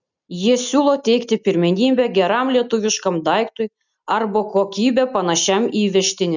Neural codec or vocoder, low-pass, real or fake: none; 7.2 kHz; real